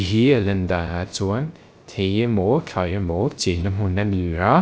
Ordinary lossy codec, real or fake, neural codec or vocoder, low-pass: none; fake; codec, 16 kHz, 0.3 kbps, FocalCodec; none